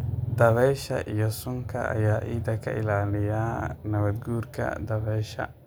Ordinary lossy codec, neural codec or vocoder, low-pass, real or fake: none; none; none; real